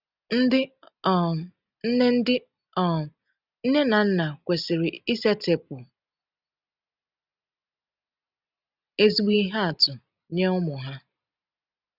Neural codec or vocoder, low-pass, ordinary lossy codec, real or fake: none; 5.4 kHz; none; real